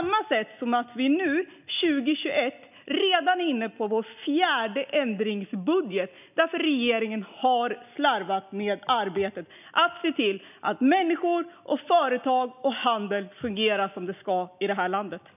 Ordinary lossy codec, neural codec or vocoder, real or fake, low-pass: none; none; real; 3.6 kHz